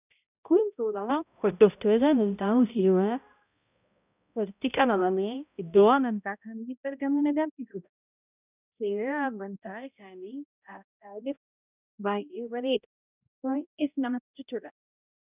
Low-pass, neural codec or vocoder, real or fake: 3.6 kHz; codec, 16 kHz, 0.5 kbps, X-Codec, HuBERT features, trained on balanced general audio; fake